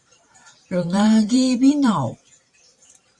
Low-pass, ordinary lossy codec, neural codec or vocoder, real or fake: 10.8 kHz; Opus, 64 kbps; vocoder, 44.1 kHz, 128 mel bands every 512 samples, BigVGAN v2; fake